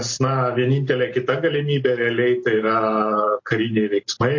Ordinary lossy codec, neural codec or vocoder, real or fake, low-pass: MP3, 32 kbps; none; real; 7.2 kHz